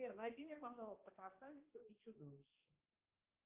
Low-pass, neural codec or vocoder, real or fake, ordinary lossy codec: 3.6 kHz; codec, 16 kHz, 1 kbps, X-Codec, HuBERT features, trained on balanced general audio; fake; Opus, 32 kbps